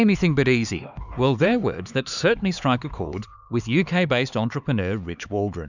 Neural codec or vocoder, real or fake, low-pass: codec, 16 kHz, 4 kbps, X-Codec, HuBERT features, trained on LibriSpeech; fake; 7.2 kHz